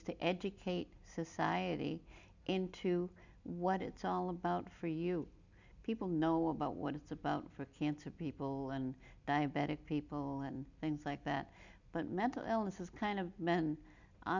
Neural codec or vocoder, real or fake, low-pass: none; real; 7.2 kHz